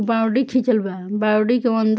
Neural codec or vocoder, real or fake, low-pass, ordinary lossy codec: none; real; none; none